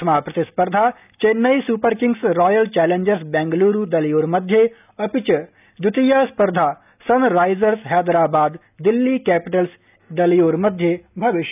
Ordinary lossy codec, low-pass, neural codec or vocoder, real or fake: none; 3.6 kHz; none; real